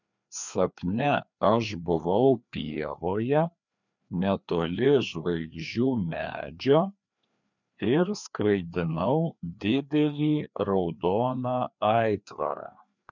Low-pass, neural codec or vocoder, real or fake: 7.2 kHz; codec, 16 kHz, 2 kbps, FreqCodec, larger model; fake